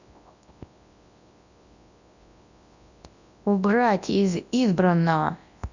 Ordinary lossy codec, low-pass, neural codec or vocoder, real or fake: none; 7.2 kHz; codec, 24 kHz, 0.9 kbps, WavTokenizer, large speech release; fake